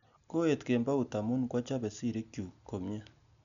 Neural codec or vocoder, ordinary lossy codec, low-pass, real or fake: none; none; 7.2 kHz; real